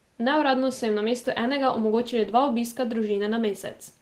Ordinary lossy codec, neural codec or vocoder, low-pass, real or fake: Opus, 16 kbps; none; 14.4 kHz; real